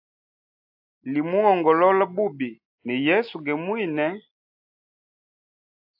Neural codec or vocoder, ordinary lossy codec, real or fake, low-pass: none; AAC, 48 kbps; real; 5.4 kHz